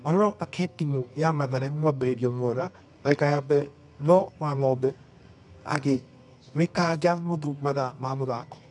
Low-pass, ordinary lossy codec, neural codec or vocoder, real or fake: 10.8 kHz; none; codec, 24 kHz, 0.9 kbps, WavTokenizer, medium music audio release; fake